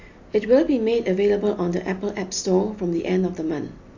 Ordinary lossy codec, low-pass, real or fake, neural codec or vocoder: AAC, 48 kbps; 7.2 kHz; real; none